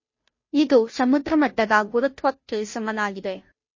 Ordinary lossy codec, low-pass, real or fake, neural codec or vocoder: MP3, 32 kbps; 7.2 kHz; fake; codec, 16 kHz, 0.5 kbps, FunCodec, trained on Chinese and English, 25 frames a second